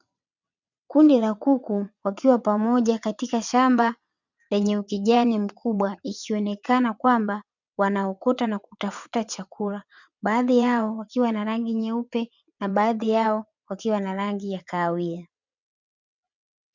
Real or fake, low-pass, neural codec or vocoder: fake; 7.2 kHz; vocoder, 22.05 kHz, 80 mel bands, WaveNeXt